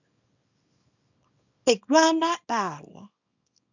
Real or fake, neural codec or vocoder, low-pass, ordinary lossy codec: fake; codec, 24 kHz, 0.9 kbps, WavTokenizer, small release; 7.2 kHz; none